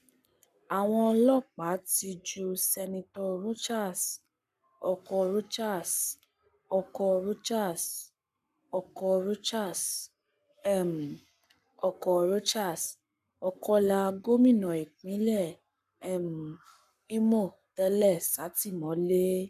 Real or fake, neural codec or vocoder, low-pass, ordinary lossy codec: fake; codec, 44.1 kHz, 7.8 kbps, Pupu-Codec; 14.4 kHz; none